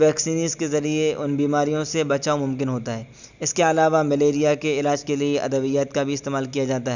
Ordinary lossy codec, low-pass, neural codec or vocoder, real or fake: none; 7.2 kHz; none; real